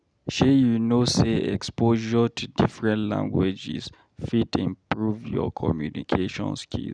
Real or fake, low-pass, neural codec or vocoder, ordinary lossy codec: real; 9.9 kHz; none; none